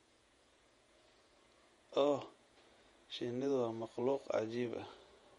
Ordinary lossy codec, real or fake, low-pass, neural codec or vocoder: MP3, 48 kbps; fake; 19.8 kHz; vocoder, 48 kHz, 128 mel bands, Vocos